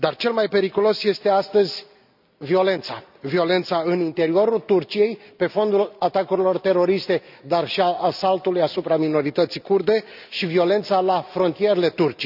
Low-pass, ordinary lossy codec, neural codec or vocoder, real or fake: 5.4 kHz; none; none; real